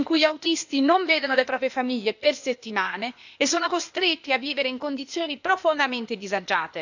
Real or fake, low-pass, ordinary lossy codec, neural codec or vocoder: fake; 7.2 kHz; none; codec, 16 kHz, 0.8 kbps, ZipCodec